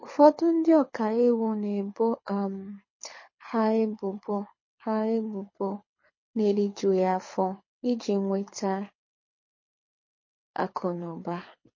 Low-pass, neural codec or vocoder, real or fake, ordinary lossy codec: 7.2 kHz; codec, 24 kHz, 6 kbps, HILCodec; fake; MP3, 32 kbps